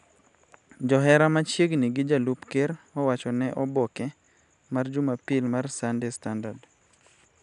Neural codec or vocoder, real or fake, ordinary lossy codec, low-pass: none; real; none; 10.8 kHz